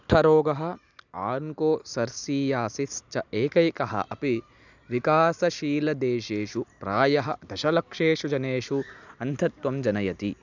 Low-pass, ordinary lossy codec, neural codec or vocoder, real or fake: 7.2 kHz; none; none; real